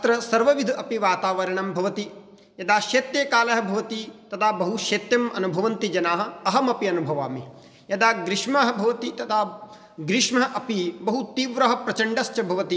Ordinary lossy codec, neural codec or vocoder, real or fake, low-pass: none; none; real; none